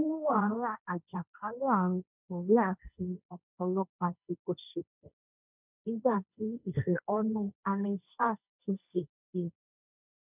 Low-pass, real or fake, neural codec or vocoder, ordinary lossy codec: 3.6 kHz; fake; codec, 16 kHz, 1.1 kbps, Voila-Tokenizer; none